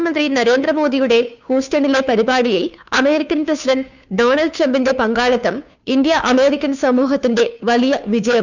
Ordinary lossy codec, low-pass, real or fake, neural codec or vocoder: none; 7.2 kHz; fake; codec, 16 kHz, 2 kbps, FunCodec, trained on Chinese and English, 25 frames a second